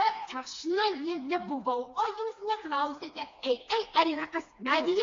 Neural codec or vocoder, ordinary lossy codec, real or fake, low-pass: codec, 16 kHz, 2 kbps, FreqCodec, smaller model; AAC, 48 kbps; fake; 7.2 kHz